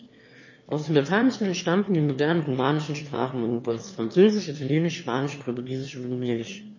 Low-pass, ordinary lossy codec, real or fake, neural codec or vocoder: 7.2 kHz; MP3, 32 kbps; fake; autoencoder, 22.05 kHz, a latent of 192 numbers a frame, VITS, trained on one speaker